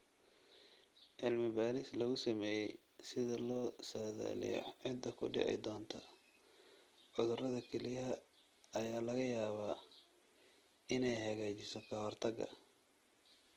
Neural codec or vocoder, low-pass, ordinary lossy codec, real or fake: none; 19.8 kHz; Opus, 16 kbps; real